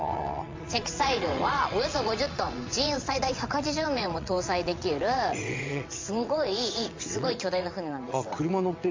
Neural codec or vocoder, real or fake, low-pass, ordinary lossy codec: vocoder, 22.05 kHz, 80 mel bands, WaveNeXt; fake; 7.2 kHz; MP3, 48 kbps